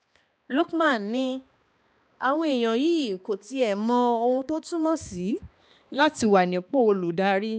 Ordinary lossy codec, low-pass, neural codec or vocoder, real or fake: none; none; codec, 16 kHz, 2 kbps, X-Codec, HuBERT features, trained on balanced general audio; fake